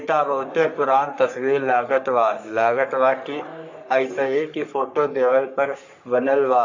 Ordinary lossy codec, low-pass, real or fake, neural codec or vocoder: AAC, 48 kbps; 7.2 kHz; fake; codec, 44.1 kHz, 3.4 kbps, Pupu-Codec